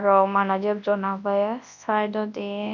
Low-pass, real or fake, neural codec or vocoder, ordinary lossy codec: 7.2 kHz; fake; codec, 24 kHz, 0.9 kbps, WavTokenizer, large speech release; none